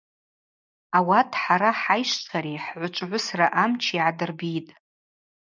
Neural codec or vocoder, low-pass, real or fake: none; 7.2 kHz; real